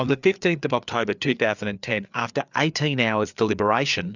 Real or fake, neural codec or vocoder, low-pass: fake; codec, 16 kHz, 4 kbps, FreqCodec, larger model; 7.2 kHz